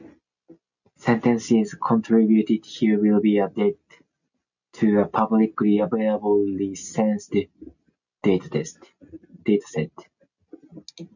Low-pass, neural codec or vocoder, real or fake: 7.2 kHz; none; real